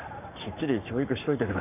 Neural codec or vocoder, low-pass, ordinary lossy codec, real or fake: codec, 44.1 kHz, 7.8 kbps, Pupu-Codec; 3.6 kHz; none; fake